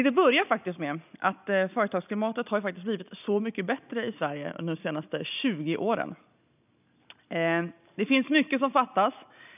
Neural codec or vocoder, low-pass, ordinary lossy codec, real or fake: none; 3.6 kHz; none; real